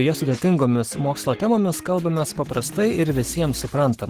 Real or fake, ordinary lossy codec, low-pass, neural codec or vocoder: fake; Opus, 16 kbps; 14.4 kHz; codec, 44.1 kHz, 7.8 kbps, Pupu-Codec